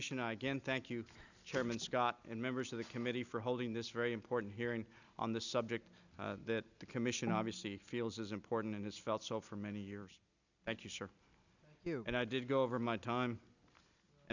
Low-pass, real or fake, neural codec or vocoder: 7.2 kHz; real; none